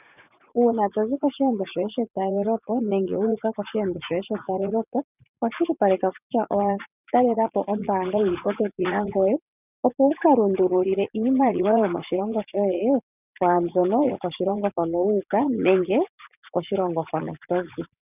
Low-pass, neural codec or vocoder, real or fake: 3.6 kHz; vocoder, 44.1 kHz, 80 mel bands, Vocos; fake